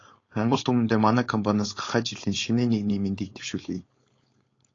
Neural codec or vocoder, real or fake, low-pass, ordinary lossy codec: codec, 16 kHz, 4.8 kbps, FACodec; fake; 7.2 kHz; AAC, 32 kbps